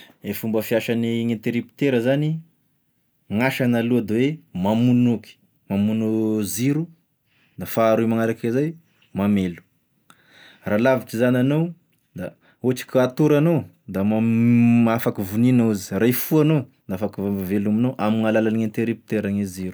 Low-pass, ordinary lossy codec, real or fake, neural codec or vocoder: none; none; real; none